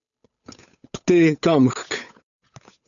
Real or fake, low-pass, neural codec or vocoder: fake; 7.2 kHz; codec, 16 kHz, 8 kbps, FunCodec, trained on Chinese and English, 25 frames a second